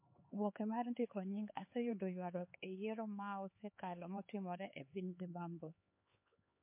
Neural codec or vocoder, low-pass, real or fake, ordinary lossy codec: codec, 16 kHz, 4 kbps, X-Codec, HuBERT features, trained on LibriSpeech; 3.6 kHz; fake; MP3, 24 kbps